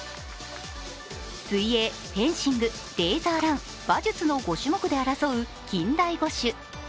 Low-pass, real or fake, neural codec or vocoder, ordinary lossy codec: none; real; none; none